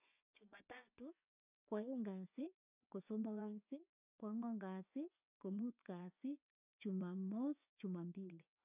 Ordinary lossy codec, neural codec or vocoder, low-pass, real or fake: none; vocoder, 24 kHz, 100 mel bands, Vocos; 3.6 kHz; fake